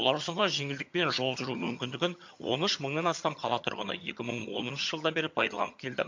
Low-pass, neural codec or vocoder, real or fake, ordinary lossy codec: 7.2 kHz; vocoder, 22.05 kHz, 80 mel bands, HiFi-GAN; fake; MP3, 48 kbps